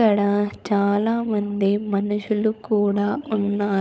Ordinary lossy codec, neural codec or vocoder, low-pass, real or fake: none; codec, 16 kHz, 16 kbps, FunCodec, trained on LibriTTS, 50 frames a second; none; fake